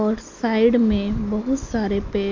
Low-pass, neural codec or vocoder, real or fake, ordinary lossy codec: 7.2 kHz; none; real; MP3, 48 kbps